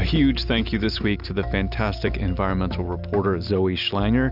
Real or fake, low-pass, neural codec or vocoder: real; 5.4 kHz; none